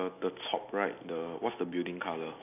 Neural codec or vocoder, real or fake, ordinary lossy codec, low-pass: none; real; none; 3.6 kHz